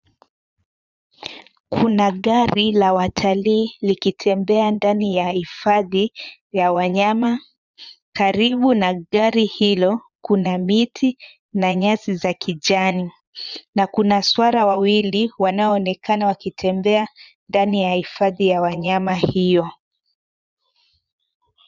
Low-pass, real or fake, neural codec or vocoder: 7.2 kHz; fake; vocoder, 22.05 kHz, 80 mel bands, Vocos